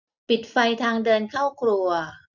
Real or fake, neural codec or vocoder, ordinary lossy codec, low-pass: real; none; none; 7.2 kHz